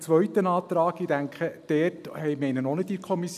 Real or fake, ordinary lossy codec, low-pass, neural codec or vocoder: real; none; 14.4 kHz; none